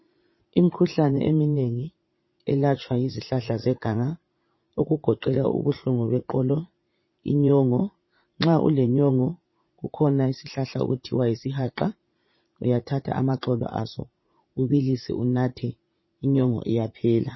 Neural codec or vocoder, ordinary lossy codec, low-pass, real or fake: vocoder, 44.1 kHz, 80 mel bands, Vocos; MP3, 24 kbps; 7.2 kHz; fake